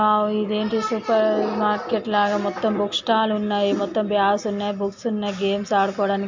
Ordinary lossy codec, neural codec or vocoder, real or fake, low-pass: MP3, 64 kbps; none; real; 7.2 kHz